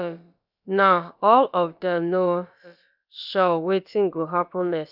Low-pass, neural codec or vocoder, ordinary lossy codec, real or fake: 5.4 kHz; codec, 16 kHz, about 1 kbps, DyCAST, with the encoder's durations; none; fake